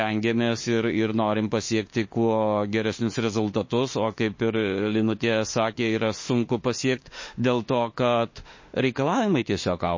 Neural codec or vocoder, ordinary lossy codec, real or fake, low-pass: autoencoder, 48 kHz, 32 numbers a frame, DAC-VAE, trained on Japanese speech; MP3, 32 kbps; fake; 7.2 kHz